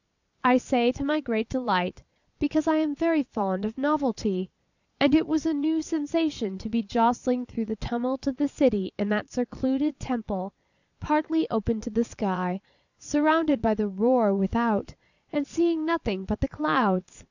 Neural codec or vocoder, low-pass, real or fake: vocoder, 22.05 kHz, 80 mel bands, Vocos; 7.2 kHz; fake